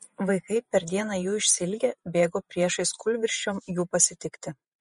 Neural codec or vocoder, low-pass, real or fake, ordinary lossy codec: vocoder, 48 kHz, 128 mel bands, Vocos; 19.8 kHz; fake; MP3, 48 kbps